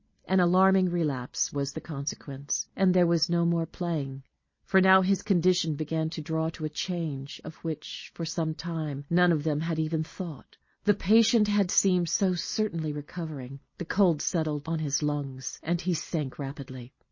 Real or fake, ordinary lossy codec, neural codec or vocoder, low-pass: real; MP3, 32 kbps; none; 7.2 kHz